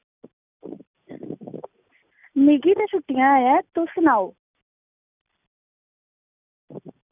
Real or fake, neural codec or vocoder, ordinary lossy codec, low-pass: real; none; none; 3.6 kHz